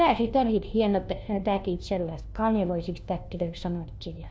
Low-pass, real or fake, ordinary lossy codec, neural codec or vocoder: none; fake; none; codec, 16 kHz, 1 kbps, FunCodec, trained on LibriTTS, 50 frames a second